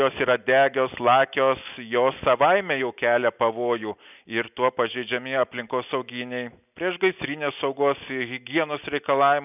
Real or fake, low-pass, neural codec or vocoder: real; 3.6 kHz; none